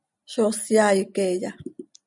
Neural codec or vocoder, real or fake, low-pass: none; real; 10.8 kHz